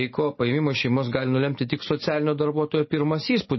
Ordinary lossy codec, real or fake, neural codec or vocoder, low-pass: MP3, 24 kbps; real; none; 7.2 kHz